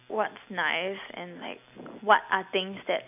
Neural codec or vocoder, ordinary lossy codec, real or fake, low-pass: none; none; real; 3.6 kHz